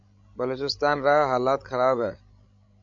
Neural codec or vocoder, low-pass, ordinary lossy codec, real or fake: codec, 16 kHz, 16 kbps, FreqCodec, larger model; 7.2 kHz; MP3, 64 kbps; fake